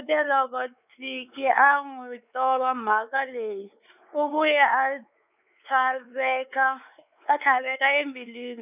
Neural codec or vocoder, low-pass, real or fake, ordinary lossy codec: codec, 16 kHz, 4 kbps, X-Codec, WavLM features, trained on Multilingual LibriSpeech; 3.6 kHz; fake; none